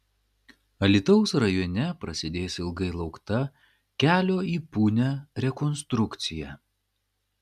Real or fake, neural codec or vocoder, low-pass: real; none; 14.4 kHz